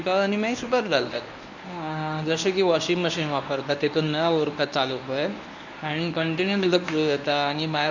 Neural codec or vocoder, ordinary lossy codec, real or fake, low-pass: codec, 24 kHz, 0.9 kbps, WavTokenizer, medium speech release version 1; none; fake; 7.2 kHz